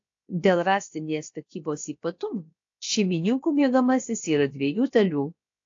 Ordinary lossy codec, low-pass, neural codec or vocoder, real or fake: AAC, 48 kbps; 7.2 kHz; codec, 16 kHz, about 1 kbps, DyCAST, with the encoder's durations; fake